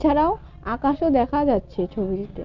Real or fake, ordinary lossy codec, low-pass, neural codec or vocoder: real; none; 7.2 kHz; none